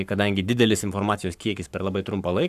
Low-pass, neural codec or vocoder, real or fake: 14.4 kHz; codec, 44.1 kHz, 7.8 kbps, Pupu-Codec; fake